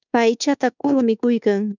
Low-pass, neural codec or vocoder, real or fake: 7.2 kHz; codec, 24 kHz, 1.2 kbps, DualCodec; fake